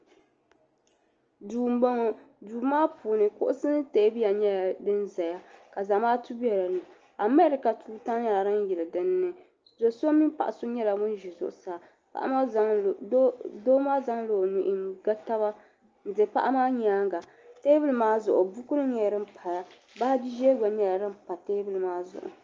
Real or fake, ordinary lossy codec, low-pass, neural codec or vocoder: real; Opus, 24 kbps; 7.2 kHz; none